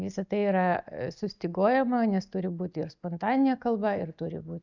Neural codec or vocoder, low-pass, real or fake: none; 7.2 kHz; real